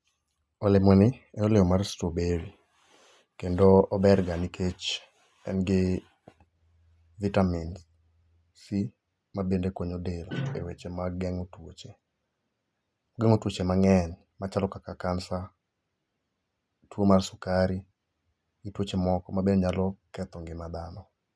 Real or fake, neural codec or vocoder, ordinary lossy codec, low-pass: real; none; none; none